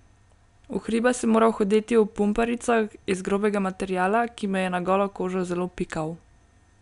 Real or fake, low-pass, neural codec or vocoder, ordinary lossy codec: real; 10.8 kHz; none; none